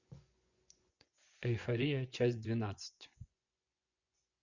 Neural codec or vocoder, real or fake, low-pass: vocoder, 24 kHz, 100 mel bands, Vocos; fake; 7.2 kHz